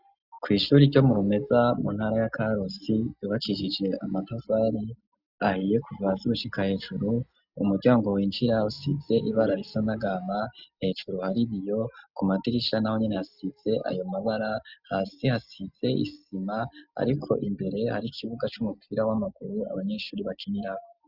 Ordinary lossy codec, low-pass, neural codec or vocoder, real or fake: Opus, 64 kbps; 5.4 kHz; none; real